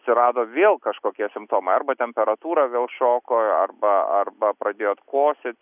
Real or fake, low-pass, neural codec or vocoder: real; 3.6 kHz; none